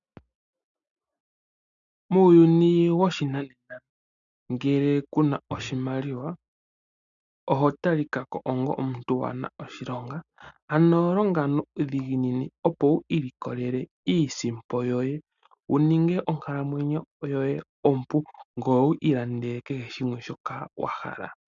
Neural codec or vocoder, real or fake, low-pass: none; real; 7.2 kHz